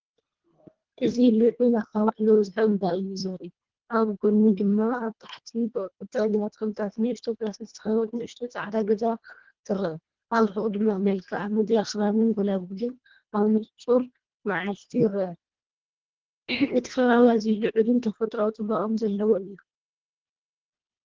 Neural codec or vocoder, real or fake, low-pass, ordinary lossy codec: codec, 24 kHz, 1.5 kbps, HILCodec; fake; 7.2 kHz; Opus, 16 kbps